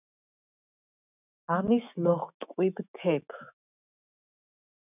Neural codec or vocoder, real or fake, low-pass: autoencoder, 48 kHz, 128 numbers a frame, DAC-VAE, trained on Japanese speech; fake; 3.6 kHz